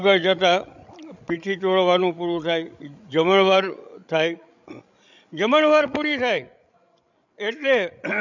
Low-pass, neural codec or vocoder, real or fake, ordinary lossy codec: 7.2 kHz; codec, 16 kHz, 16 kbps, FreqCodec, larger model; fake; none